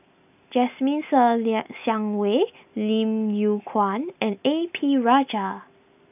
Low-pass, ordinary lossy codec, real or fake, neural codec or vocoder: 3.6 kHz; none; real; none